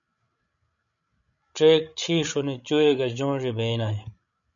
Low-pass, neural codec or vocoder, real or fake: 7.2 kHz; codec, 16 kHz, 16 kbps, FreqCodec, larger model; fake